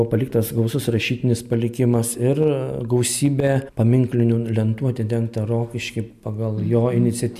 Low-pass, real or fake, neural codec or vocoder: 14.4 kHz; real; none